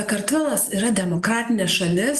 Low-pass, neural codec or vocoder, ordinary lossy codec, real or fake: 14.4 kHz; none; Opus, 64 kbps; real